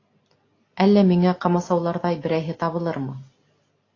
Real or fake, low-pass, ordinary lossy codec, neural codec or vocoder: real; 7.2 kHz; AAC, 32 kbps; none